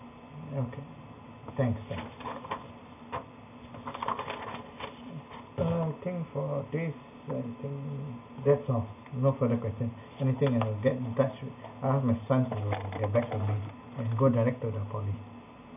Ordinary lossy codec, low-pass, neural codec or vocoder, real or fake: none; 3.6 kHz; none; real